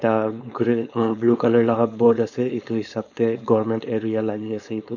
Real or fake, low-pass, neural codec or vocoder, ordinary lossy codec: fake; 7.2 kHz; codec, 16 kHz, 4.8 kbps, FACodec; none